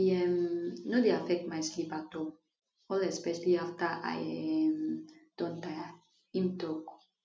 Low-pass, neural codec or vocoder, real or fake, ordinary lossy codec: none; none; real; none